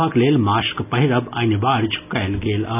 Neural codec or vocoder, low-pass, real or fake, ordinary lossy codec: none; 3.6 kHz; real; none